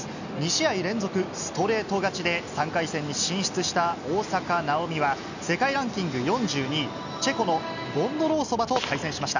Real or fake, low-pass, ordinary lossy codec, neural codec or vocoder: real; 7.2 kHz; none; none